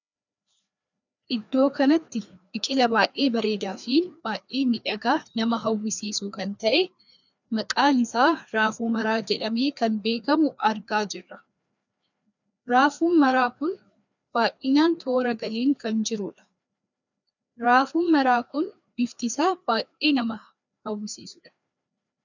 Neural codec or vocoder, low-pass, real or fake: codec, 16 kHz, 2 kbps, FreqCodec, larger model; 7.2 kHz; fake